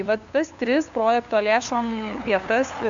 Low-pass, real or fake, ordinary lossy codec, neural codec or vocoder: 7.2 kHz; fake; MP3, 64 kbps; codec, 16 kHz, 2 kbps, FunCodec, trained on LibriTTS, 25 frames a second